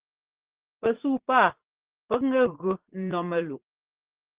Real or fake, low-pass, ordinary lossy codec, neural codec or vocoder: fake; 3.6 kHz; Opus, 16 kbps; vocoder, 44.1 kHz, 80 mel bands, Vocos